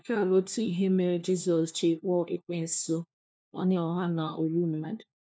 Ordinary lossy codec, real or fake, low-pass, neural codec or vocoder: none; fake; none; codec, 16 kHz, 1 kbps, FunCodec, trained on LibriTTS, 50 frames a second